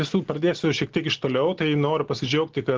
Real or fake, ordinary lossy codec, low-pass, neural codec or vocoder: real; Opus, 16 kbps; 7.2 kHz; none